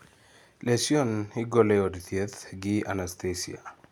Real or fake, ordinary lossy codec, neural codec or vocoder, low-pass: real; none; none; 19.8 kHz